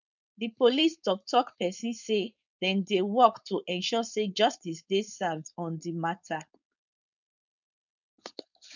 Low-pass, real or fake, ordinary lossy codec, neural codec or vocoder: 7.2 kHz; fake; none; codec, 16 kHz, 4.8 kbps, FACodec